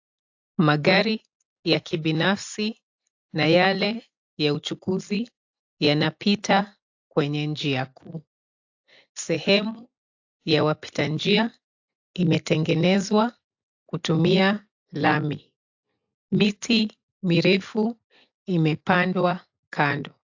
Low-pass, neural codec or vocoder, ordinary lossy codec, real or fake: 7.2 kHz; none; AAC, 48 kbps; real